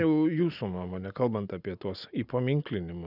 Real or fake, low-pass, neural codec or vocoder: real; 5.4 kHz; none